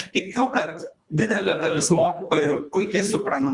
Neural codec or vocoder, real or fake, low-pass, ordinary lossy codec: codec, 24 kHz, 1.5 kbps, HILCodec; fake; 10.8 kHz; Opus, 64 kbps